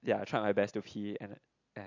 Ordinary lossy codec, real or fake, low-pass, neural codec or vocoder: AAC, 48 kbps; real; 7.2 kHz; none